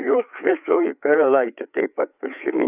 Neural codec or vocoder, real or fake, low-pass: codec, 16 kHz, 4 kbps, FreqCodec, larger model; fake; 3.6 kHz